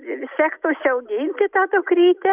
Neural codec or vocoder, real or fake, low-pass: none; real; 3.6 kHz